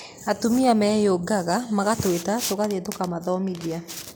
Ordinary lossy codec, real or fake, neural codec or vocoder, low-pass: none; real; none; none